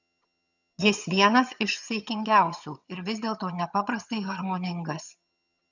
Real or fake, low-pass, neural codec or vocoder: fake; 7.2 kHz; vocoder, 22.05 kHz, 80 mel bands, HiFi-GAN